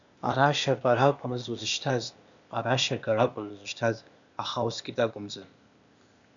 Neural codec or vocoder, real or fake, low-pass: codec, 16 kHz, 0.8 kbps, ZipCodec; fake; 7.2 kHz